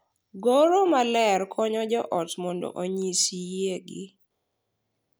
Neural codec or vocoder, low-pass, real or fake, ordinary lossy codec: none; none; real; none